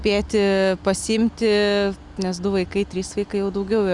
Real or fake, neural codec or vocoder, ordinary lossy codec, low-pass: real; none; Opus, 64 kbps; 10.8 kHz